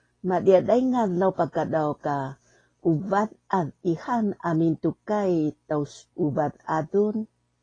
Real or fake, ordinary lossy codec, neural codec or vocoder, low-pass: real; AAC, 32 kbps; none; 9.9 kHz